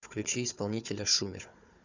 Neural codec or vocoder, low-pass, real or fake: codec, 16 kHz, 4 kbps, FunCodec, trained on Chinese and English, 50 frames a second; 7.2 kHz; fake